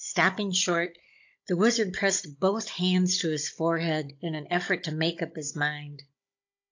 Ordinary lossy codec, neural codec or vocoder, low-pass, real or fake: AAC, 48 kbps; codec, 16 kHz, 16 kbps, FunCodec, trained on Chinese and English, 50 frames a second; 7.2 kHz; fake